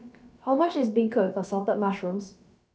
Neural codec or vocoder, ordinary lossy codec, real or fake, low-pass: codec, 16 kHz, about 1 kbps, DyCAST, with the encoder's durations; none; fake; none